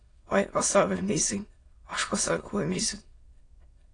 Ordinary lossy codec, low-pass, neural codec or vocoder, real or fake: AAC, 32 kbps; 9.9 kHz; autoencoder, 22.05 kHz, a latent of 192 numbers a frame, VITS, trained on many speakers; fake